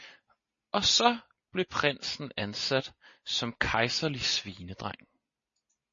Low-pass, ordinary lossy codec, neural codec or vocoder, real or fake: 7.2 kHz; MP3, 32 kbps; none; real